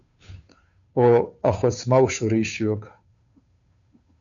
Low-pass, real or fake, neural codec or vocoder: 7.2 kHz; fake; codec, 16 kHz, 2 kbps, FunCodec, trained on Chinese and English, 25 frames a second